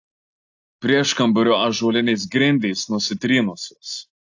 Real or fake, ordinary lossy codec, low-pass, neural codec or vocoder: real; AAC, 48 kbps; 7.2 kHz; none